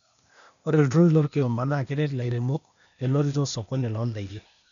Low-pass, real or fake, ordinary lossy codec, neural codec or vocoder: 7.2 kHz; fake; none; codec, 16 kHz, 0.8 kbps, ZipCodec